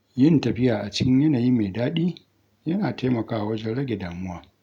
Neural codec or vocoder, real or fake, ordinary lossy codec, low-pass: none; real; none; 19.8 kHz